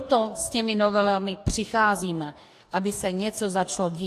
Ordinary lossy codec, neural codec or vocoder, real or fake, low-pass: AAC, 64 kbps; codec, 44.1 kHz, 2.6 kbps, DAC; fake; 14.4 kHz